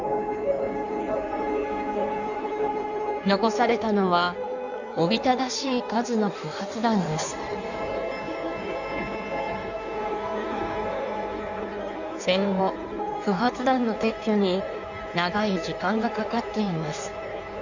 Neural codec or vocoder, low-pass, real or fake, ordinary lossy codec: codec, 16 kHz in and 24 kHz out, 1.1 kbps, FireRedTTS-2 codec; 7.2 kHz; fake; none